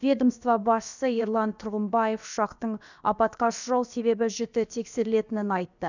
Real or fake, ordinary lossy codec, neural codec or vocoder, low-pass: fake; none; codec, 16 kHz, about 1 kbps, DyCAST, with the encoder's durations; 7.2 kHz